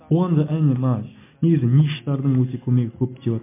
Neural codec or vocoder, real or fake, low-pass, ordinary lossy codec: none; real; 3.6 kHz; MP3, 24 kbps